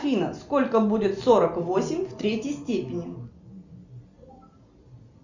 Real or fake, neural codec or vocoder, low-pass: real; none; 7.2 kHz